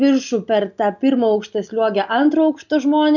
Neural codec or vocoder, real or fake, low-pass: none; real; 7.2 kHz